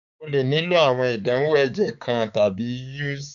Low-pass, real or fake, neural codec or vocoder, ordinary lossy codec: 7.2 kHz; fake; codec, 16 kHz, 4 kbps, X-Codec, HuBERT features, trained on balanced general audio; none